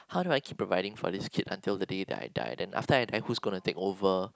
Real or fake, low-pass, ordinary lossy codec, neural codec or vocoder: real; none; none; none